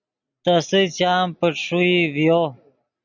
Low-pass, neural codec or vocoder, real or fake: 7.2 kHz; none; real